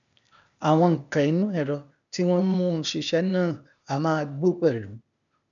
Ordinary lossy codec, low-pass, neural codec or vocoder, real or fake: none; 7.2 kHz; codec, 16 kHz, 0.8 kbps, ZipCodec; fake